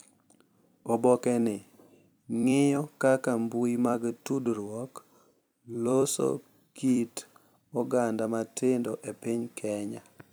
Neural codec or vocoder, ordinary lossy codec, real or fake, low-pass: vocoder, 44.1 kHz, 128 mel bands every 256 samples, BigVGAN v2; none; fake; none